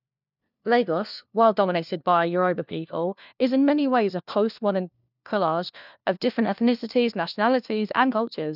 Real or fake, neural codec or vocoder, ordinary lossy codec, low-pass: fake; codec, 16 kHz, 1 kbps, FunCodec, trained on LibriTTS, 50 frames a second; none; 5.4 kHz